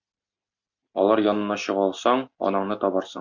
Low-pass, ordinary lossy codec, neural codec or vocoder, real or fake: 7.2 kHz; MP3, 64 kbps; none; real